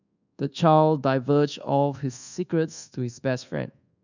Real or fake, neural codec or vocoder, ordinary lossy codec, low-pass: fake; codec, 24 kHz, 1.2 kbps, DualCodec; none; 7.2 kHz